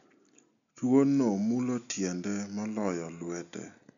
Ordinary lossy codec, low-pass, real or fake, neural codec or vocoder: none; 7.2 kHz; real; none